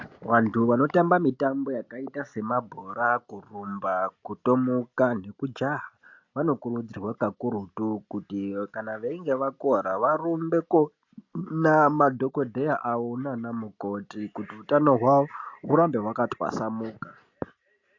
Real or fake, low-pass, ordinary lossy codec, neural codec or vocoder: real; 7.2 kHz; AAC, 48 kbps; none